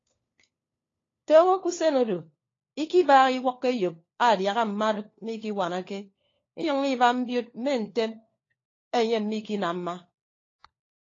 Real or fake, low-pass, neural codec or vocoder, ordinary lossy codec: fake; 7.2 kHz; codec, 16 kHz, 4 kbps, FunCodec, trained on LibriTTS, 50 frames a second; AAC, 32 kbps